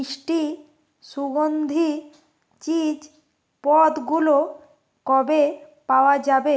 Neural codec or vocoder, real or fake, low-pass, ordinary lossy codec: none; real; none; none